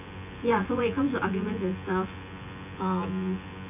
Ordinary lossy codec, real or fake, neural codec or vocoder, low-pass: none; fake; vocoder, 24 kHz, 100 mel bands, Vocos; 3.6 kHz